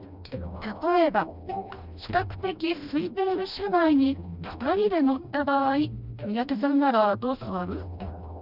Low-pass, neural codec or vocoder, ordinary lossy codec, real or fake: 5.4 kHz; codec, 16 kHz, 1 kbps, FreqCodec, smaller model; none; fake